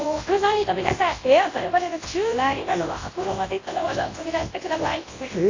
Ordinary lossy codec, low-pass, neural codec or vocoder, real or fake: AAC, 32 kbps; 7.2 kHz; codec, 24 kHz, 0.9 kbps, WavTokenizer, large speech release; fake